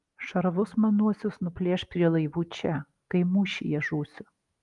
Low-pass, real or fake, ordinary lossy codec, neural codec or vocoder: 10.8 kHz; real; Opus, 32 kbps; none